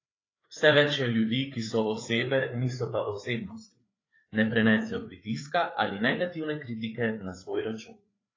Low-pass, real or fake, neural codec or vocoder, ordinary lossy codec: 7.2 kHz; fake; codec, 16 kHz, 4 kbps, FreqCodec, larger model; AAC, 32 kbps